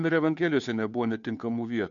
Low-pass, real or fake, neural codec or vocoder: 7.2 kHz; fake; codec, 16 kHz, 2 kbps, FunCodec, trained on Chinese and English, 25 frames a second